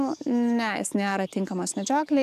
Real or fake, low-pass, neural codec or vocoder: fake; 14.4 kHz; codec, 44.1 kHz, 7.8 kbps, DAC